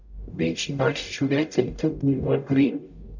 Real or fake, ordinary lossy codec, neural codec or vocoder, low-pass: fake; none; codec, 44.1 kHz, 0.9 kbps, DAC; 7.2 kHz